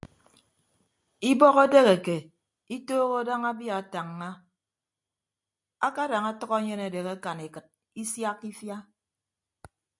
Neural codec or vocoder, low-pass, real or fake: none; 10.8 kHz; real